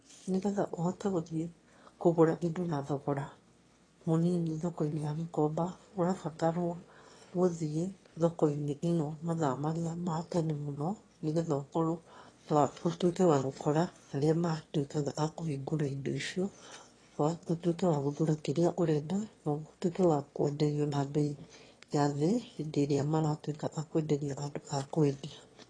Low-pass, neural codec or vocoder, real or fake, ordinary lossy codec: 9.9 kHz; autoencoder, 22.05 kHz, a latent of 192 numbers a frame, VITS, trained on one speaker; fake; AAC, 32 kbps